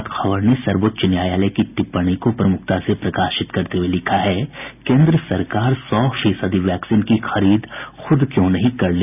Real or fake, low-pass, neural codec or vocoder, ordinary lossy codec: real; 3.6 kHz; none; none